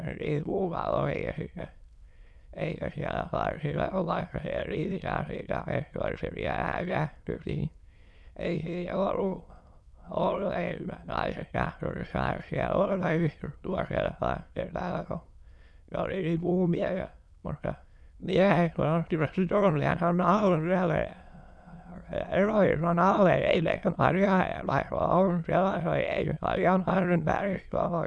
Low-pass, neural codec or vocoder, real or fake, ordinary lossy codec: none; autoencoder, 22.05 kHz, a latent of 192 numbers a frame, VITS, trained on many speakers; fake; none